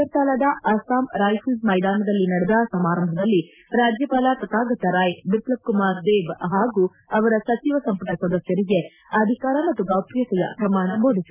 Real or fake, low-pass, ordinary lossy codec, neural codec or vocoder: real; 3.6 kHz; none; none